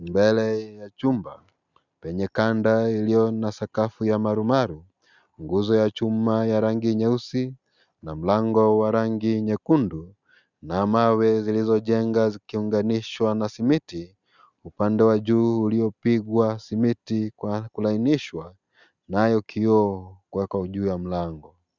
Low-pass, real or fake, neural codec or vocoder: 7.2 kHz; real; none